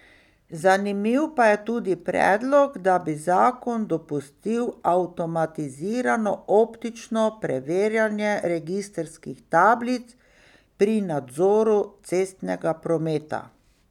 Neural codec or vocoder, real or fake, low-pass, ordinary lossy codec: none; real; 19.8 kHz; none